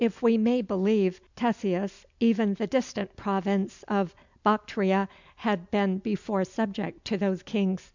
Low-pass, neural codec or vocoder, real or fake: 7.2 kHz; none; real